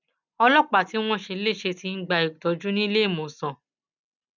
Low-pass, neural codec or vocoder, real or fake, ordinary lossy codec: 7.2 kHz; none; real; none